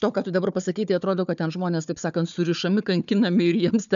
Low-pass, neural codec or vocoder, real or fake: 7.2 kHz; codec, 16 kHz, 4 kbps, FunCodec, trained on Chinese and English, 50 frames a second; fake